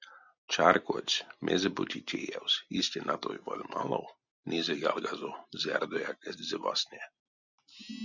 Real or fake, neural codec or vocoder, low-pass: real; none; 7.2 kHz